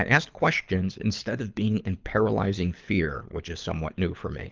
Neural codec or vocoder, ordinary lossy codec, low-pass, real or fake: codec, 24 kHz, 6 kbps, HILCodec; Opus, 24 kbps; 7.2 kHz; fake